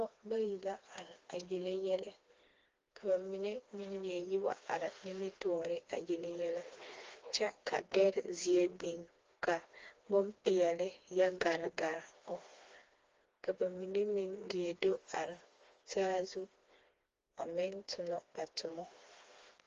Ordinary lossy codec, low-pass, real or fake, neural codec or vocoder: Opus, 32 kbps; 7.2 kHz; fake; codec, 16 kHz, 2 kbps, FreqCodec, smaller model